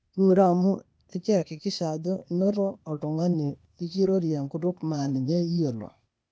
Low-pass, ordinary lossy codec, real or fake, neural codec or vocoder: none; none; fake; codec, 16 kHz, 0.8 kbps, ZipCodec